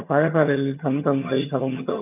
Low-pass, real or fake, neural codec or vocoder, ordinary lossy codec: 3.6 kHz; fake; vocoder, 22.05 kHz, 80 mel bands, HiFi-GAN; none